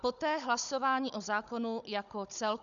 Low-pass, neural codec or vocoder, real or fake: 7.2 kHz; codec, 16 kHz, 16 kbps, FunCodec, trained on Chinese and English, 50 frames a second; fake